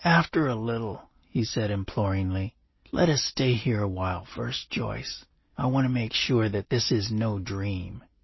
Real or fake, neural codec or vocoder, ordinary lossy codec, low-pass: real; none; MP3, 24 kbps; 7.2 kHz